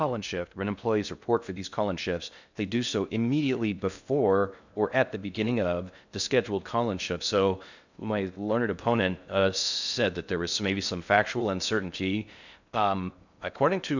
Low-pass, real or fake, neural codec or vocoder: 7.2 kHz; fake; codec, 16 kHz in and 24 kHz out, 0.6 kbps, FocalCodec, streaming, 2048 codes